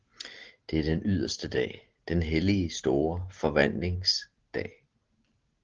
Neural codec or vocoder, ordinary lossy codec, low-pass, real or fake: none; Opus, 16 kbps; 7.2 kHz; real